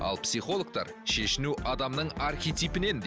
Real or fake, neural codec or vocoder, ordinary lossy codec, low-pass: real; none; none; none